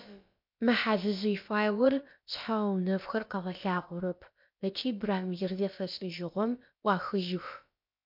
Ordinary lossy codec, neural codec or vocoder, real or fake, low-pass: MP3, 48 kbps; codec, 16 kHz, about 1 kbps, DyCAST, with the encoder's durations; fake; 5.4 kHz